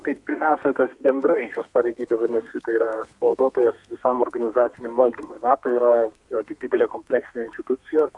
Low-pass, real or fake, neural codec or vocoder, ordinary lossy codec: 10.8 kHz; fake; codec, 32 kHz, 1.9 kbps, SNAC; MP3, 64 kbps